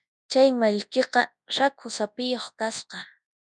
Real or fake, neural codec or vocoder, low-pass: fake; codec, 24 kHz, 0.9 kbps, WavTokenizer, large speech release; 10.8 kHz